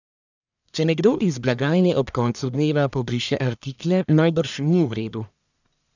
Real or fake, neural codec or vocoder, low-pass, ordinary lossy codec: fake; codec, 44.1 kHz, 1.7 kbps, Pupu-Codec; 7.2 kHz; none